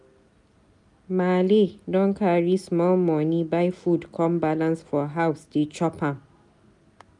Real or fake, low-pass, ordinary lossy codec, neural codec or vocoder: real; 10.8 kHz; none; none